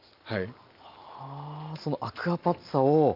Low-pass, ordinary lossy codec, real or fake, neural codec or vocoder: 5.4 kHz; Opus, 24 kbps; real; none